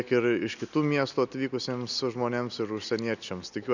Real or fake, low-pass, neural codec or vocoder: real; 7.2 kHz; none